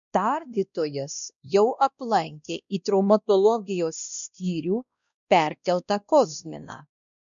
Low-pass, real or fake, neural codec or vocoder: 7.2 kHz; fake; codec, 16 kHz, 1 kbps, X-Codec, WavLM features, trained on Multilingual LibriSpeech